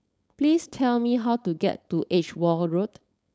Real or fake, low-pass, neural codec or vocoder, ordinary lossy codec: fake; none; codec, 16 kHz, 4.8 kbps, FACodec; none